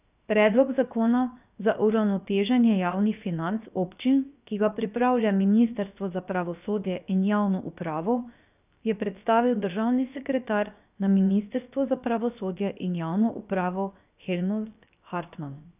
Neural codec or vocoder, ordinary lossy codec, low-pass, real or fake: codec, 16 kHz, 0.7 kbps, FocalCodec; none; 3.6 kHz; fake